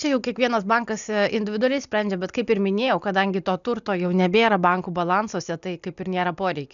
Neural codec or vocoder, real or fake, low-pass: none; real; 7.2 kHz